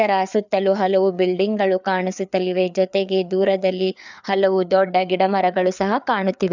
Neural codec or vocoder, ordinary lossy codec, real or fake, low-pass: codec, 16 kHz, 4 kbps, FreqCodec, larger model; none; fake; 7.2 kHz